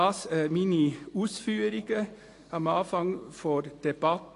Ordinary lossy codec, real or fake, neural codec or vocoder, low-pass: AAC, 48 kbps; real; none; 10.8 kHz